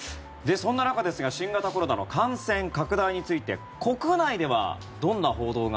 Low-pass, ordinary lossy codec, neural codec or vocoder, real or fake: none; none; none; real